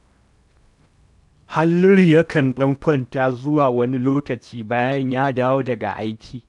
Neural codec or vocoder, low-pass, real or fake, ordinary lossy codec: codec, 16 kHz in and 24 kHz out, 0.8 kbps, FocalCodec, streaming, 65536 codes; 10.8 kHz; fake; none